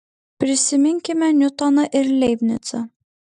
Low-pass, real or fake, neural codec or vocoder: 10.8 kHz; real; none